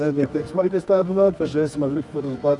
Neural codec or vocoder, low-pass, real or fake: codec, 24 kHz, 0.9 kbps, WavTokenizer, medium music audio release; 10.8 kHz; fake